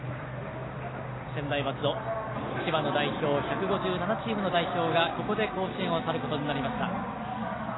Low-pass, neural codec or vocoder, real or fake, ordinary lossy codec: 7.2 kHz; none; real; AAC, 16 kbps